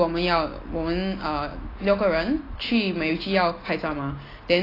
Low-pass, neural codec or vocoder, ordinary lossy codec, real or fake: 5.4 kHz; none; AAC, 24 kbps; real